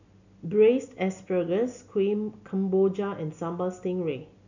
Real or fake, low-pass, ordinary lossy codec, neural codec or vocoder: real; 7.2 kHz; none; none